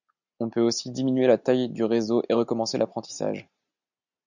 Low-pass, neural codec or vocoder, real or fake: 7.2 kHz; none; real